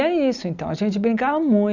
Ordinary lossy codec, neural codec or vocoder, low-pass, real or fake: none; none; 7.2 kHz; real